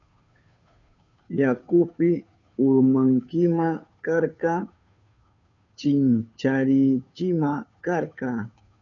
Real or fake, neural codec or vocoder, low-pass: fake; codec, 16 kHz, 2 kbps, FunCodec, trained on Chinese and English, 25 frames a second; 7.2 kHz